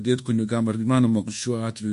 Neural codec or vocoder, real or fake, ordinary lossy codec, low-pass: codec, 24 kHz, 1.2 kbps, DualCodec; fake; MP3, 48 kbps; 10.8 kHz